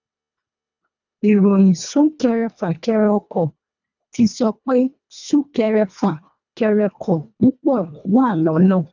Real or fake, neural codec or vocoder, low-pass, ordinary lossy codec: fake; codec, 24 kHz, 1.5 kbps, HILCodec; 7.2 kHz; none